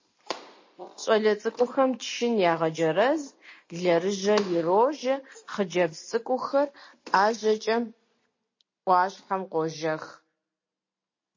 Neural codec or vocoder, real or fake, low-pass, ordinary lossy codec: none; real; 7.2 kHz; MP3, 32 kbps